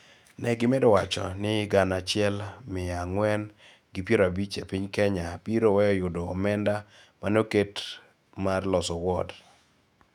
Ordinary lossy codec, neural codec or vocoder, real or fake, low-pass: none; autoencoder, 48 kHz, 128 numbers a frame, DAC-VAE, trained on Japanese speech; fake; 19.8 kHz